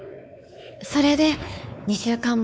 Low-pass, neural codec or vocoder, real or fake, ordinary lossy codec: none; codec, 16 kHz, 4 kbps, X-Codec, WavLM features, trained on Multilingual LibriSpeech; fake; none